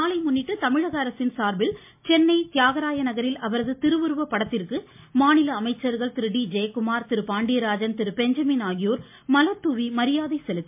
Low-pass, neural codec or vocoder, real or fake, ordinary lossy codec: 3.6 kHz; none; real; MP3, 32 kbps